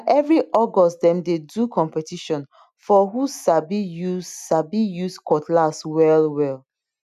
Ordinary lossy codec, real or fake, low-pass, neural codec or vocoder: none; real; 14.4 kHz; none